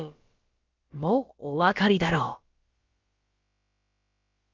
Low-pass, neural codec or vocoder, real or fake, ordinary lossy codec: 7.2 kHz; codec, 16 kHz, about 1 kbps, DyCAST, with the encoder's durations; fake; Opus, 32 kbps